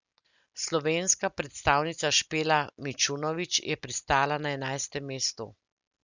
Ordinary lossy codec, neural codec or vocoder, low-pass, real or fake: Opus, 64 kbps; none; 7.2 kHz; real